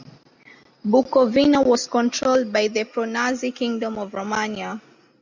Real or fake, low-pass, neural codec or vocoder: real; 7.2 kHz; none